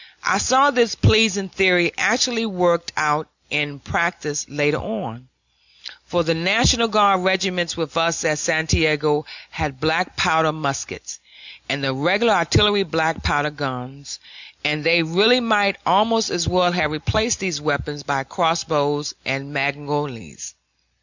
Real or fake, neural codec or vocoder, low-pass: real; none; 7.2 kHz